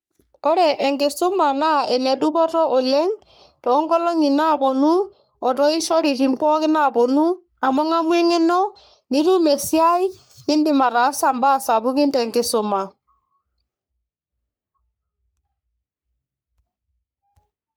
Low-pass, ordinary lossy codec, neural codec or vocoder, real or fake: none; none; codec, 44.1 kHz, 3.4 kbps, Pupu-Codec; fake